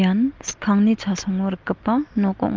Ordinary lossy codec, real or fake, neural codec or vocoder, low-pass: Opus, 32 kbps; real; none; 7.2 kHz